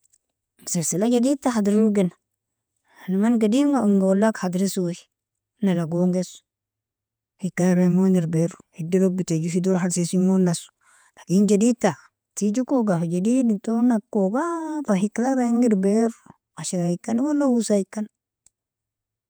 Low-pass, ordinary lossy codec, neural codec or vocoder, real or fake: none; none; vocoder, 48 kHz, 128 mel bands, Vocos; fake